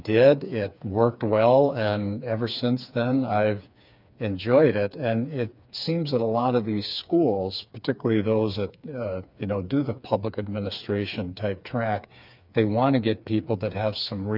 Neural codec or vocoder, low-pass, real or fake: codec, 16 kHz, 4 kbps, FreqCodec, smaller model; 5.4 kHz; fake